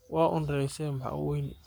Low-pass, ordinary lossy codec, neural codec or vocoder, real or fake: none; none; codec, 44.1 kHz, 7.8 kbps, Pupu-Codec; fake